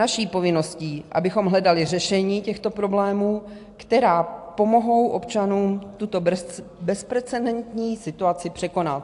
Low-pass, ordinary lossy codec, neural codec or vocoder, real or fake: 10.8 kHz; AAC, 64 kbps; none; real